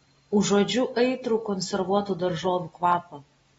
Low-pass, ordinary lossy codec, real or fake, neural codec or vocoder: 9.9 kHz; AAC, 24 kbps; real; none